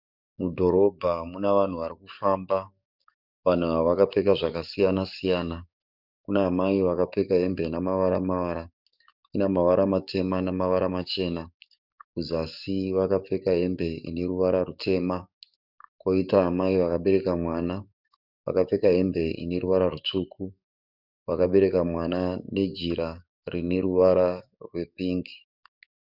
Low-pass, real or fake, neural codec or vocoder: 5.4 kHz; fake; codec, 44.1 kHz, 7.8 kbps, DAC